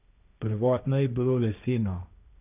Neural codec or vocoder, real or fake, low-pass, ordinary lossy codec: codec, 16 kHz, 1.1 kbps, Voila-Tokenizer; fake; 3.6 kHz; none